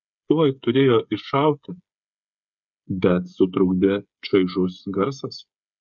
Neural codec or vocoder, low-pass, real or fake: codec, 16 kHz, 8 kbps, FreqCodec, smaller model; 7.2 kHz; fake